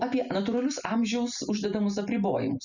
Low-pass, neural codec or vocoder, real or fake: 7.2 kHz; none; real